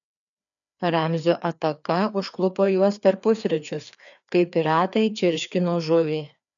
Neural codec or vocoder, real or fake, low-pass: codec, 16 kHz, 2 kbps, FreqCodec, larger model; fake; 7.2 kHz